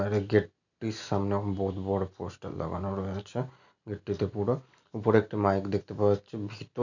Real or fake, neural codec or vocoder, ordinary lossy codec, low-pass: real; none; none; 7.2 kHz